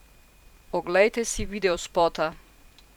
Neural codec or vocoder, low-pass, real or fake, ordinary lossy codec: vocoder, 44.1 kHz, 128 mel bands every 512 samples, BigVGAN v2; 19.8 kHz; fake; none